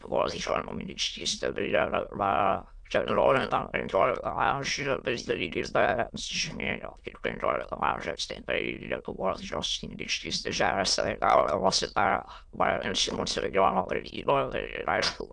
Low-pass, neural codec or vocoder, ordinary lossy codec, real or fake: 9.9 kHz; autoencoder, 22.05 kHz, a latent of 192 numbers a frame, VITS, trained on many speakers; AAC, 64 kbps; fake